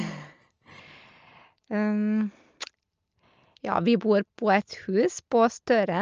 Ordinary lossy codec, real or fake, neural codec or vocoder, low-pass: Opus, 24 kbps; real; none; 7.2 kHz